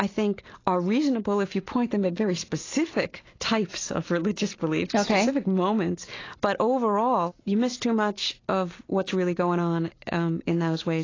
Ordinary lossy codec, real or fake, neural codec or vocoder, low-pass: AAC, 32 kbps; real; none; 7.2 kHz